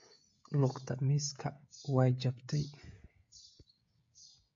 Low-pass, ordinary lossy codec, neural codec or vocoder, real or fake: 7.2 kHz; MP3, 48 kbps; codec, 16 kHz, 16 kbps, FreqCodec, smaller model; fake